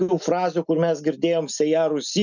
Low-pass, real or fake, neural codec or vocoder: 7.2 kHz; real; none